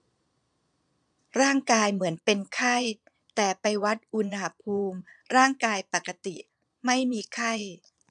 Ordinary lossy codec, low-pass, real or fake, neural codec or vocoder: none; 9.9 kHz; fake; vocoder, 22.05 kHz, 80 mel bands, Vocos